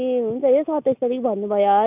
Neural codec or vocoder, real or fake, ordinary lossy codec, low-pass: none; real; none; 3.6 kHz